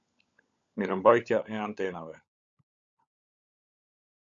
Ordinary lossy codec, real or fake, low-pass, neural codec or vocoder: MP3, 64 kbps; fake; 7.2 kHz; codec, 16 kHz, 16 kbps, FunCodec, trained on LibriTTS, 50 frames a second